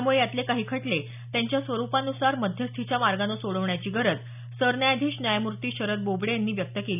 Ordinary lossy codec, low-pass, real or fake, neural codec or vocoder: none; 3.6 kHz; real; none